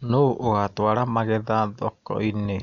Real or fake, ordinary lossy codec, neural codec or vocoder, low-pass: real; none; none; 7.2 kHz